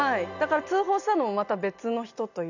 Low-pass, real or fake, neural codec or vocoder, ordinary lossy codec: 7.2 kHz; real; none; none